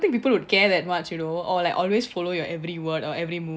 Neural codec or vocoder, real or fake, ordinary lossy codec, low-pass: none; real; none; none